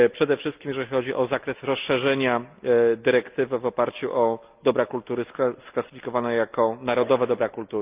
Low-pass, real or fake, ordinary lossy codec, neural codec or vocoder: 3.6 kHz; real; Opus, 24 kbps; none